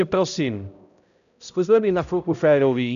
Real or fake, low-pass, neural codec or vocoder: fake; 7.2 kHz; codec, 16 kHz, 0.5 kbps, X-Codec, HuBERT features, trained on balanced general audio